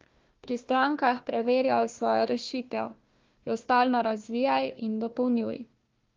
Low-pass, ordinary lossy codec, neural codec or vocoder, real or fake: 7.2 kHz; Opus, 32 kbps; codec, 16 kHz, 1 kbps, FunCodec, trained on Chinese and English, 50 frames a second; fake